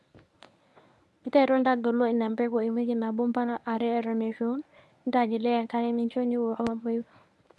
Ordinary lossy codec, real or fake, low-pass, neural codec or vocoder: none; fake; none; codec, 24 kHz, 0.9 kbps, WavTokenizer, medium speech release version 1